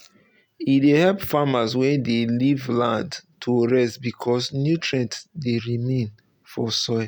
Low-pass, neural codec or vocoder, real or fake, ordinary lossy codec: none; none; real; none